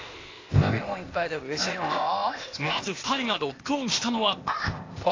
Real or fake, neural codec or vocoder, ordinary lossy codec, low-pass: fake; codec, 16 kHz, 0.8 kbps, ZipCodec; AAC, 32 kbps; 7.2 kHz